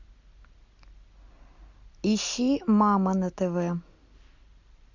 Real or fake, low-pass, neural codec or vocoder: real; 7.2 kHz; none